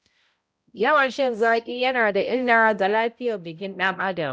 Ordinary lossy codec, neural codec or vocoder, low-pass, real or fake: none; codec, 16 kHz, 0.5 kbps, X-Codec, HuBERT features, trained on balanced general audio; none; fake